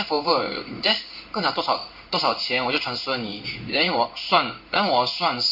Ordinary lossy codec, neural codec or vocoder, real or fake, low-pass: none; codec, 16 kHz in and 24 kHz out, 1 kbps, XY-Tokenizer; fake; 5.4 kHz